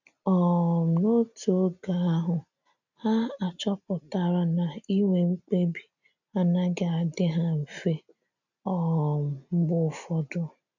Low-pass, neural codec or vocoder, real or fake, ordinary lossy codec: 7.2 kHz; none; real; none